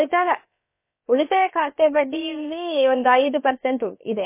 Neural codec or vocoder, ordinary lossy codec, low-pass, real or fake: codec, 16 kHz, about 1 kbps, DyCAST, with the encoder's durations; MP3, 24 kbps; 3.6 kHz; fake